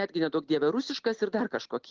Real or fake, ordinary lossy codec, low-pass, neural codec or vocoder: real; Opus, 16 kbps; 7.2 kHz; none